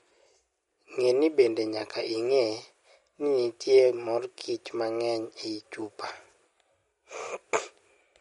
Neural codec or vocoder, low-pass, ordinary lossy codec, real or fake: none; 19.8 kHz; MP3, 48 kbps; real